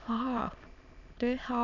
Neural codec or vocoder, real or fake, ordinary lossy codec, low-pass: autoencoder, 22.05 kHz, a latent of 192 numbers a frame, VITS, trained on many speakers; fake; none; 7.2 kHz